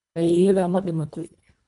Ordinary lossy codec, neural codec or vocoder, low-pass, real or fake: none; codec, 24 kHz, 1.5 kbps, HILCodec; 10.8 kHz; fake